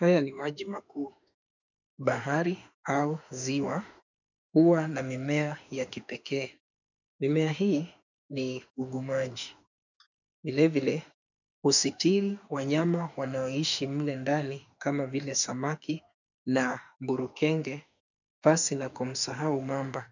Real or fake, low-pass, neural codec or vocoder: fake; 7.2 kHz; autoencoder, 48 kHz, 32 numbers a frame, DAC-VAE, trained on Japanese speech